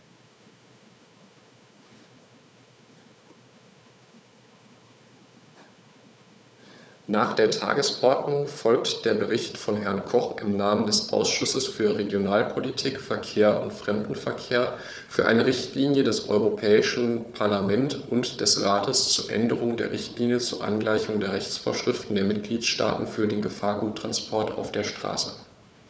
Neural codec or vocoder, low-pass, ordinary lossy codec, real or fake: codec, 16 kHz, 4 kbps, FunCodec, trained on Chinese and English, 50 frames a second; none; none; fake